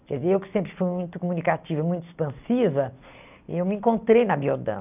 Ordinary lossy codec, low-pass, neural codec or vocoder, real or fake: none; 3.6 kHz; none; real